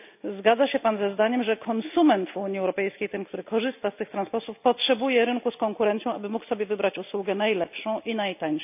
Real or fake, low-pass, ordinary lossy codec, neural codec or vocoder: real; 3.6 kHz; none; none